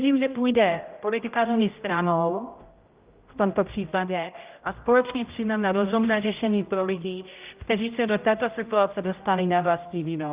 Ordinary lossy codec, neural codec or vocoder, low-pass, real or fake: Opus, 32 kbps; codec, 16 kHz, 0.5 kbps, X-Codec, HuBERT features, trained on general audio; 3.6 kHz; fake